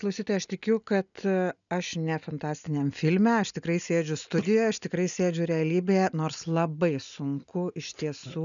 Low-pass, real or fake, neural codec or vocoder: 7.2 kHz; real; none